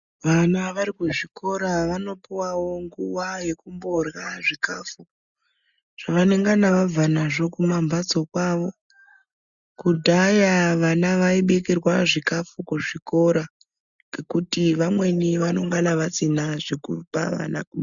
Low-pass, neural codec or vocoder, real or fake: 7.2 kHz; none; real